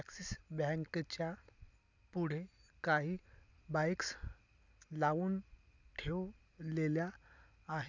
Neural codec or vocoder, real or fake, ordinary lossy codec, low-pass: none; real; none; 7.2 kHz